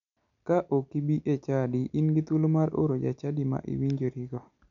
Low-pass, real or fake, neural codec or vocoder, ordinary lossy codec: 7.2 kHz; real; none; none